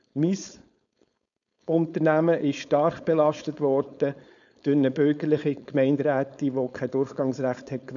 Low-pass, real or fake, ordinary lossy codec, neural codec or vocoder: 7.2 kHz; fake; none; codec, 16 kHz, 4.8 kbps, FACodec